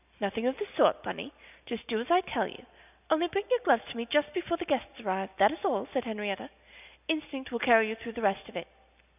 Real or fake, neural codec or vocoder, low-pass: real; none; 3.6 kHz